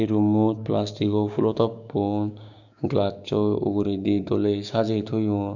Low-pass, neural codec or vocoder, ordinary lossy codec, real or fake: 7.2 kHz; codec, 16 kHz, 6 kbps, DAC; none; fake